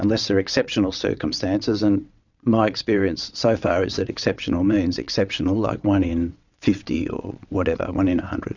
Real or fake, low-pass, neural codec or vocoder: fake; 7.2 kHz; vocoder, 22.05 kHz, 80 mel bands, WaveNeXt